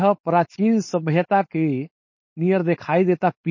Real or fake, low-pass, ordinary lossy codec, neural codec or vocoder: fake; 7.2 kHz; MP3, 32 kbps; codec, 16 kHz, 4.8 kbps, FACodec